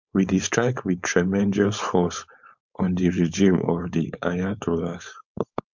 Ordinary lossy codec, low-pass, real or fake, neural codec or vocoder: MP3, 64 kbps; 7.2 kHz; fake; codec, 16 kHz, 4.8 kbps, FACodec